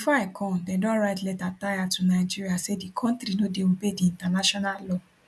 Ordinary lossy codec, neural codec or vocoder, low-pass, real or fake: none; none; none; real